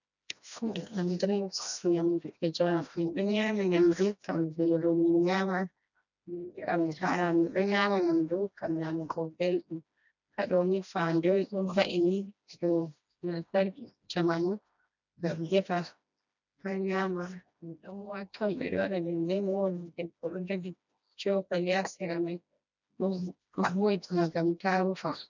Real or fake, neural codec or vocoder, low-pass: fake; codec, 16 kHz, 1 kbps, FreqCodec, smaller model; 7.2 kHz